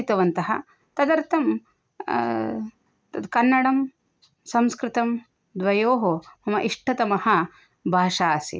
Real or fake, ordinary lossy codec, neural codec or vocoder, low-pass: real; none; none; none